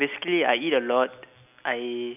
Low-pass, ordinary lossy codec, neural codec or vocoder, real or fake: 3.6 kHz; none; none; real